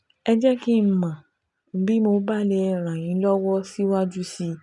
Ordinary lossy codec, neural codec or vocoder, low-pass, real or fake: none; none; 10.8 kHz; real